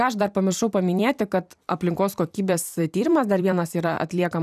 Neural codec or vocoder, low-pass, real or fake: vocoder, 44.1 kHz, 128 mel bands every 256 samples, BigVGAN v2; 14.4 kHz; fake